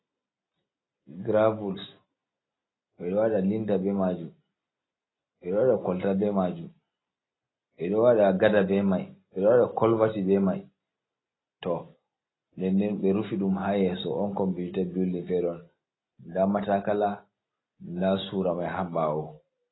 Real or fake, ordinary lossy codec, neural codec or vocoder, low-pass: real; AAC, 16 kbps; none; 7.2 kHz